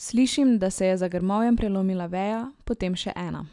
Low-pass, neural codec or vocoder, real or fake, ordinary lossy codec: 10.8 kHz; none; real; none